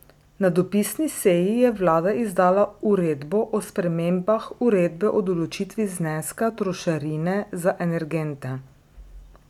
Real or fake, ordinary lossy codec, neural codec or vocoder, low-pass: real; none; none; 19.8 kHz